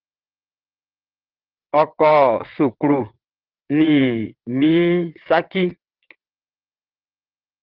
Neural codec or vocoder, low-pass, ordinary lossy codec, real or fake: vocoder, 22.05 kHz, 80 mel bands, WaveNeXt; 5.4 kHz; Opus, 16 kbps; fake